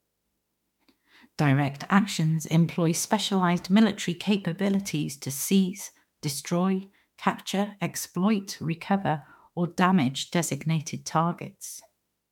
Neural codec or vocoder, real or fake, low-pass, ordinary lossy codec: autoencoder, 48 kHz, 32 numbers a frame, DAC-VAE, trained on Japanese speech; fake; 19.8 kHz; MP3, 96 kbps